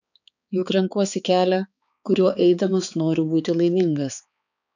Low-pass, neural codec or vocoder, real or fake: 7.2 kHz; codec, 16 kHz, 4 kbps, X-Codec, HuBERT features, trained on balanced general audio; fake